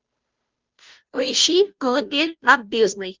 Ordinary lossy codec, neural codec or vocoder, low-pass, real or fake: Opus, 32 kbps; codec, 16 kHz, 0.5 kbps, FunCodec, trained on Chinese and English, 25 frames a second; 7.2 kHz; fake